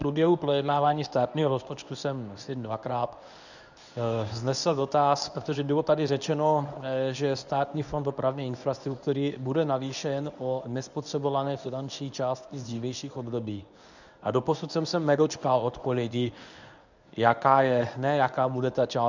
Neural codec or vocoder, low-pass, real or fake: codec, 24 kHz, 0.9 kbps, WavTokenizer, medium speech release version 1; 7.2 kHz; fake